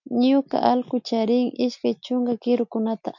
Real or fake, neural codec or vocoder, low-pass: real; none; 7.2 kHz